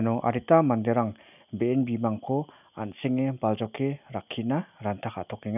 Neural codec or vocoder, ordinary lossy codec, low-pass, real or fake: none; none; 3.6 kHz; real